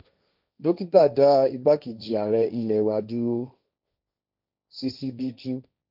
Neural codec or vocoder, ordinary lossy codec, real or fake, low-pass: codec, 16 kHz, 1.1 kbps, Voila-Tokenizer; none; fake; 5.4 kHz